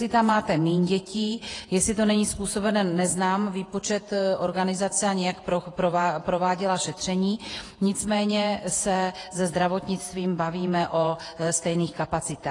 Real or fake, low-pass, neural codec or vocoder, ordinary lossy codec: fake; 10.8 kHz; vocoder, 48 kHz, 128 mel bands, Vocos; AAC, 32 kbps